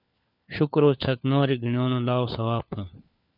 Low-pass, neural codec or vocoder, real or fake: 5.4 kHz; codec, 16 kHz, 4 kbps, FunCodec, trained on LibriTTS, 50 frames a second; fake